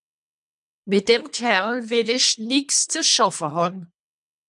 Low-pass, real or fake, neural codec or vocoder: 10.8 kHz; fake; codec, 24 kHz, 3 kbps, HILCodec